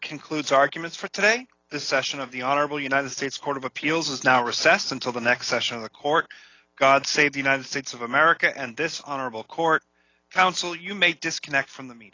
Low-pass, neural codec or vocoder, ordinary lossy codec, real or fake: 7.2 kHz; none; AAC, 32 kbps; real